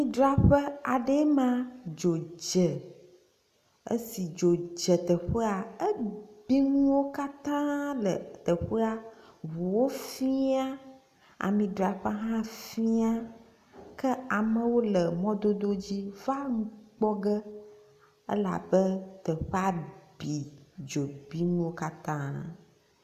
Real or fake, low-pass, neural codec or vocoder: real; 14.4 kHz; none